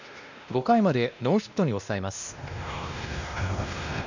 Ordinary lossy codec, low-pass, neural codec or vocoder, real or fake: none; 7.2 kHz; codec, 16 kHz, 1 kbps, X-Codec, WavLM features, trained on Multilingual LibriSpeech; fake